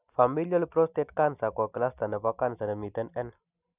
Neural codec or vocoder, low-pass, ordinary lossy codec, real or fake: none; 3.6 kHz; none; real